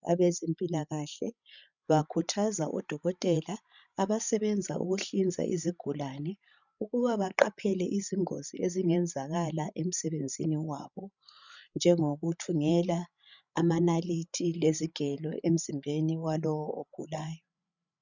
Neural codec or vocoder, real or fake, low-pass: codec, 16 kHz, 16 kbps, FreqCodec, larger model; fake; 7.2 kHz